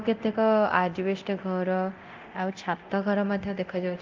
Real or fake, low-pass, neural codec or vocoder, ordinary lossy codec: fake; 7.2 kHz; codec, 24 kHz, 0.9 kbps, DualCodec; Opus, 32 kbps